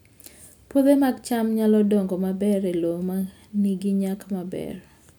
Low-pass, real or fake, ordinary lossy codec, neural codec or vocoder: none; real; none; none